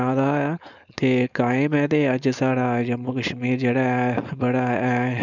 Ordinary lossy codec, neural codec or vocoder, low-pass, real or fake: none; codec, 16 kHz, 4.8 kbps, FACodec; 7.2 kHz; fake